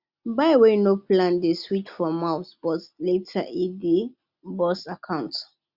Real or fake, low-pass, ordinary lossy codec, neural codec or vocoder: real; 5.4 kHz; Opus, 64 kbps; none